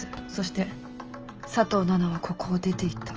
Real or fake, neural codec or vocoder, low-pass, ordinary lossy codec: real; none; 7.2 kHz; Opus, 24 kbps